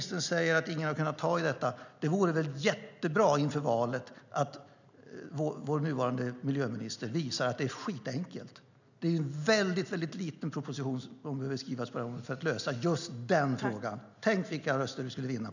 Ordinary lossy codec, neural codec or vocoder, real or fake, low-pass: none; none; real; 7.2 kHz